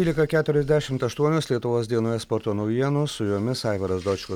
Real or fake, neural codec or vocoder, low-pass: real; none; 19.8 kHz